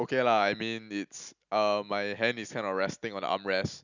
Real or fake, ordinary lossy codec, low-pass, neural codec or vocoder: real; none; 7.2 kHz; none